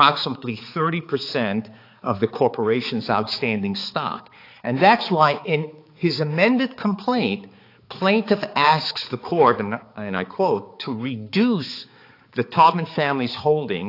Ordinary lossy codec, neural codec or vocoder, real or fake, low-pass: AAC, 32 kbps; codec, 16 kHz, 4 kbps, X-Codec, HuBERT features, trained on balanced general audio; fake; 5.4 kHz